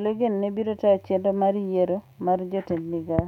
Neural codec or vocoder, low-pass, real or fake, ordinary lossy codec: autoencoder, 48 kHz, 128 numbers a frame, DAC-VAE, trained on Japanese speech; 19.8 kHz; fake; none